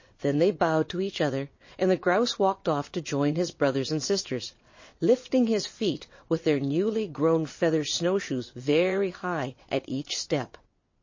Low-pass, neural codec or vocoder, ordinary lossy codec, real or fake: 7.2 kHz; vocoder, 22.05 kHz, 80 mel bands, WaveNeXt; MP3, 32 kbps; fake